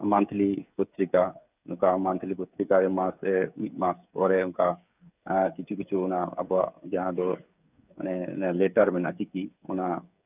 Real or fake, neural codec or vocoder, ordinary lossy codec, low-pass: fake; codec, 16 kHz, 8 kbps, FreqCodec, smaller model; none; 3.6 kHz